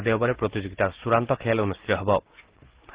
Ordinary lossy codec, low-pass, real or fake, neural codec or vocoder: Opus, 16 kbps; 3.6 kHz; real; none